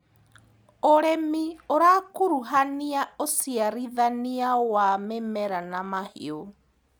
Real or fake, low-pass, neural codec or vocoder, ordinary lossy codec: real; none; none; none